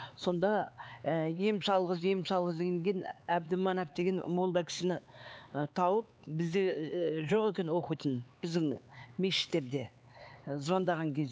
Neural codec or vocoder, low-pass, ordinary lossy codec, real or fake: codec, 16 kHz, 4 kbps, X-Codec, HuBERT features, trained on LibriSpeech; none; none; fake